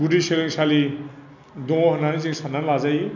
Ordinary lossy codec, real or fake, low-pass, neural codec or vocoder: none; real; 7.2 kHz; none